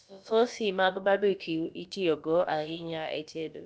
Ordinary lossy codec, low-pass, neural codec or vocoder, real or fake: none; none; codec, 16 kHz, about 1 kbps, DyCAST, with the encoder's durations; fake